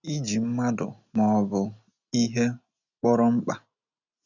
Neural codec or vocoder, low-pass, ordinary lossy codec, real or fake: none; 7.2 kHz; none; real